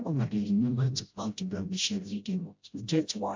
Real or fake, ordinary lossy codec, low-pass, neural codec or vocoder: fake; MP3, 64 kbps; 7.2 kHz; codec, 16 kHz, 0.5 kbps, FreqCodec, smaller model